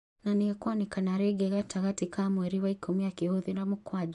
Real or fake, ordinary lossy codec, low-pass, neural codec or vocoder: fake; AAC, 96 kbps; 10.8 kHz; vocoder, 24 kHz, 100 mel bands, Vocos